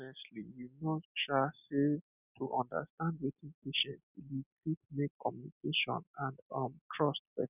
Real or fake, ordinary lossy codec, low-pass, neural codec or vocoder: real; none; 3.6 kHz; none